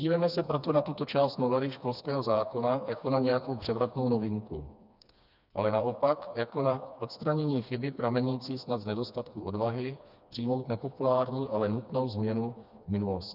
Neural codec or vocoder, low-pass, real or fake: codec, 16 kHz, 2 kbps, FreqCodec, smaller model; 5.4 kHz; fake